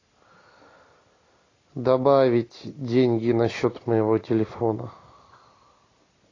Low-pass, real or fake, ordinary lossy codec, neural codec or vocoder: 7.2 kHz; real; AAC, 32 kbps; none